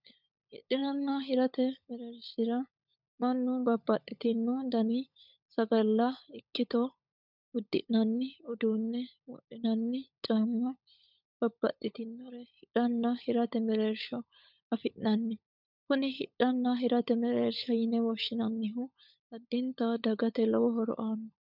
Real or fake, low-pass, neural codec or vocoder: fake; 5.4 kHz; codec, 16 kHz, 16 kbps, FunCodec, trained on LibriTTS, 50 frames a second